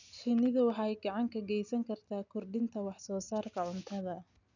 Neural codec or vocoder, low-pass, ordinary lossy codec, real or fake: none; 7.2 kHz; none; real